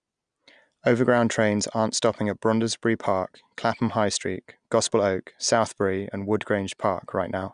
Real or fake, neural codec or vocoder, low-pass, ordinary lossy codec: real; none; 9.9 kHz; none